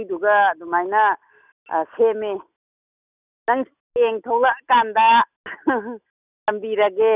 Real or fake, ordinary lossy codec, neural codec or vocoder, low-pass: real; none; none; 3.6 kHz